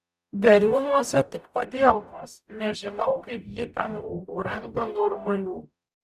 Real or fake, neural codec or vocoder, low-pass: fake; codec, 44.1 kHz, 0.9 kbps, DAC; 14.4 kHz